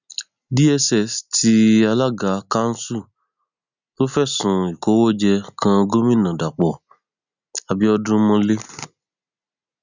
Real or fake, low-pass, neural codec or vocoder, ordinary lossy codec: real; 7.2 kHz; none; none